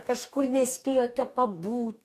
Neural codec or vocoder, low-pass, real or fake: codec, 44.1 kHz, 2.6 kbps, DAC; 14.4 kHz; fake